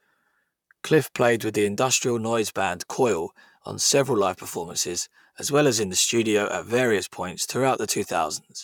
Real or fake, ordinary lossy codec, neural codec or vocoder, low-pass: fake; none; vocoder, 44.1 kHz, 128 mel bands, Pupu-Vocoder; 19.8 kHz